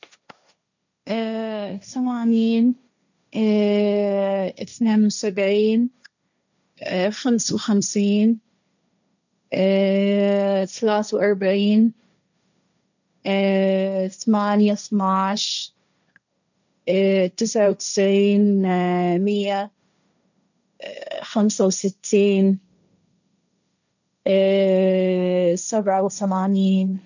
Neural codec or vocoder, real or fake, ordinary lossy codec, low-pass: codec, 16 kHz, 1.1 kbps, Voila-Tokenizer; fake; none; 7.2 kHz